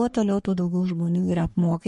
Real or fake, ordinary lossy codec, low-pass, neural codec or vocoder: fake; MP3, 48 kbps; 14.4 kHz; codec, 44.1 kHz, 3.4 kbps, Pupu-Codec